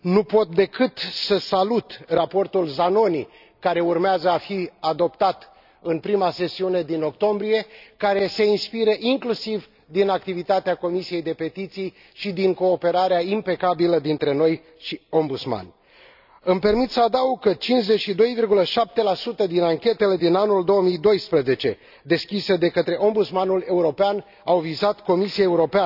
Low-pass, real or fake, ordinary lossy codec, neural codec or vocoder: 5.4 kHz; real; none; none